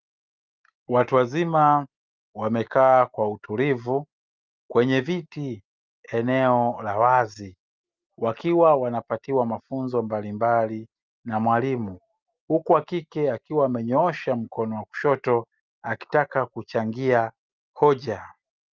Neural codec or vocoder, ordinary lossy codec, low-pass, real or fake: none; Opus, 24 kbps; 7.2 kHz; real